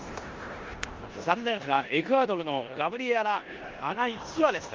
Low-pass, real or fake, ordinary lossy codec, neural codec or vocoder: 7.2 kHz; fake; Opus, 32 kbps; codec, 16 kHz in and 24 kHz out, 0.9 kbps, LongCat-Audio-Codec, four codebook decoder